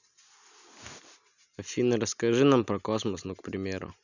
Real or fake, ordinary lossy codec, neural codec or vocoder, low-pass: real; none; none; 7.2 kHz